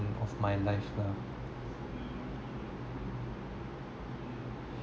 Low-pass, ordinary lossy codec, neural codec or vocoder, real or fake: none; none; none; real